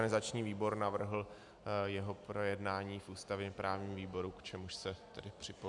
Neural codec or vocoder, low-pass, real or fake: none; 10.8 kHz; real